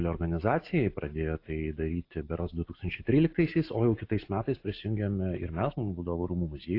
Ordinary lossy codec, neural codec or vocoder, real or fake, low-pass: AAC, 32 kbps; none; real; 5.4 kHz